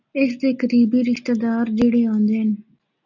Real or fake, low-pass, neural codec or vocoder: real; 7.2 kHz; none